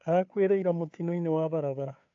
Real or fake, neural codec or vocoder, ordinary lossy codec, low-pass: fake; codec, 16 kHz, 2 kbps, FunCodec, trained on Chinese and English, 25 frames a second; AAC, 48 kbps; 7.2 kHz